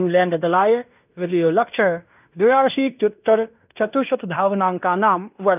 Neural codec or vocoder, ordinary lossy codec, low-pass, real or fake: codec, 16 kHz in and 24 kHz out, 0.9 kbps, LongCat-Audio-Codec, fine tuned four codebook decoder; none; 3.6 kHz; fake